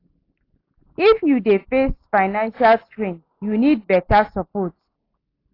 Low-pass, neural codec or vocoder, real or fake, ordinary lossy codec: 5.4 kHz; none; real; AAC, 32 kbps